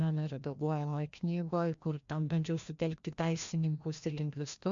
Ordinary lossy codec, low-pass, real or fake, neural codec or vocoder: MP3, 64 kbps; 7.2 kHz; fake; codec, 16 kHz, 1 kbps, FreqCodec, larger model